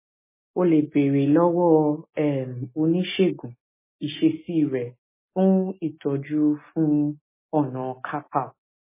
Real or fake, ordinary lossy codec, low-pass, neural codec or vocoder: real; MP3, 16 kbps; 3.6 kHz; none